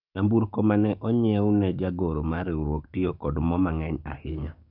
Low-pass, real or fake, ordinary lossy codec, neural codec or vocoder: 5.4 kHz; fake; none; codec, 44.1 kHz, 7.8 kbps, DAC